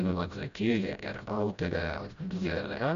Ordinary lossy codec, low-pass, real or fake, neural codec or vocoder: MP3, 64 kbps; 7.2 kHz; fake; codec, 16 kHz, 0.5 kbps, FreqCodec, smaller model